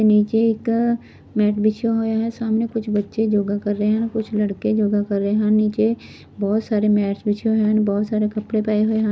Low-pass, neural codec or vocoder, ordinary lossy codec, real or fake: none; none; none; real